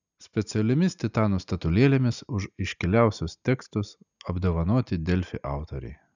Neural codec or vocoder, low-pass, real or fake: none; 7.2 kHz; real